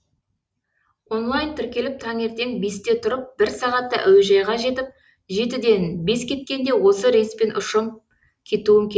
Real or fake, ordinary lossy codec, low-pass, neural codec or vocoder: real; none; none; none